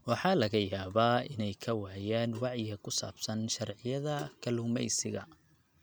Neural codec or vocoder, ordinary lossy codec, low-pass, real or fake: none; none; none; real